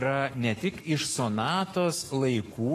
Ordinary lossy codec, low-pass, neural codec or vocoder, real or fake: AAC, 48 kbps; 14.4 kHz; codec, 44.1 kHz, 7.8 kbps, Pupu-Codec; fake